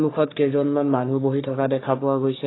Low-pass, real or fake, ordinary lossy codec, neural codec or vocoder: 7.2 kHz; fake; AAC, 16 kbps; codec, 44.1 kHz, 3.4 kbps, Pupu-Codec